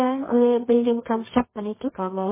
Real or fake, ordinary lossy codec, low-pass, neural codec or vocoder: fake; MP3, 16 kbps; 3.6 kHz; codec, 24 kHz, 0.9 kbps, WavTokenizer, medium music audio release